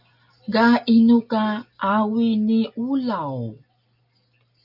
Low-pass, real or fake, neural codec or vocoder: 5.4 kHz; real; none